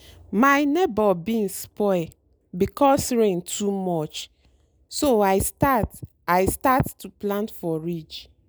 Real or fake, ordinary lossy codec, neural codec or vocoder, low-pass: real; none; none; none